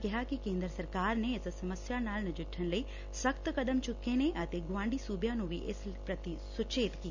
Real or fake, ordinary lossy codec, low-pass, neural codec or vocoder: real; none; 7.2 kHz; none